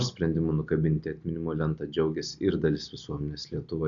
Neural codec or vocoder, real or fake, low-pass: none; real; 7.2 kHz